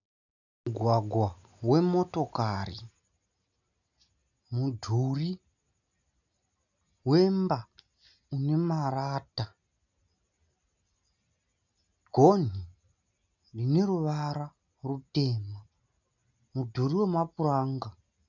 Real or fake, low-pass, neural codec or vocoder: real; 7.2 kHz; none